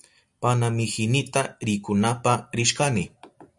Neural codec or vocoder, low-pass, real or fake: none; 10.8 kHz; real